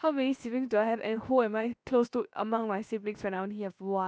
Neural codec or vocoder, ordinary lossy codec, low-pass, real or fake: codec, 16 kHz, 0.7 kbps, FocalCodec; none; none; fake